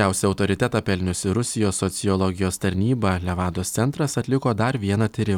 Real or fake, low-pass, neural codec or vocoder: real; 19.8 kHz; none